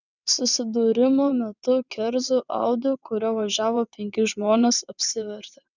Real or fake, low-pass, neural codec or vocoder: fake; 7.2 kHz; vocoder, 22.05 kHz, 80 mel bands, WaveNeXt